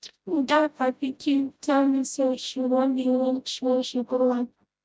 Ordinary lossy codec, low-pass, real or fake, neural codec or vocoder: none; none; fake; codec, 16 kHz, 0.5 kbps, FreqCodec, smaller model